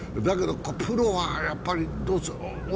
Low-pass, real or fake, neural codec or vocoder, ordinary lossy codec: none; real; none; none